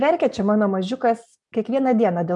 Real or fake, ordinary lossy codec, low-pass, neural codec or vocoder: real; MP3, 96 kbps; 10.8 kHz; none